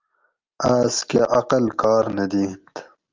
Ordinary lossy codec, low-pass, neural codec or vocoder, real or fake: Opus, 32 kbps; 7.2 kHz; none; real